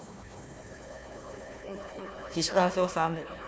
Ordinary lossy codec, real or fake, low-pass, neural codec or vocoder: none; fake; none; codec, 16 kHz, 1 kbps, FunCodec, trained on Chinese and English, 50 frames a second